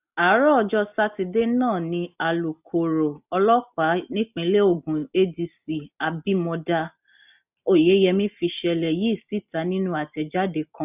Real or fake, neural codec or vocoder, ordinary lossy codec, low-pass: real; none; none; 3.6 kHz